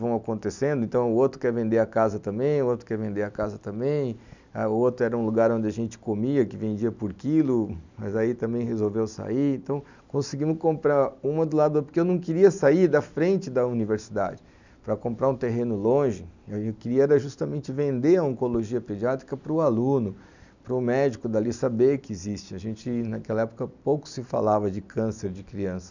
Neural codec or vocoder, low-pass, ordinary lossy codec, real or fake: none; 7.2 kHz; none; real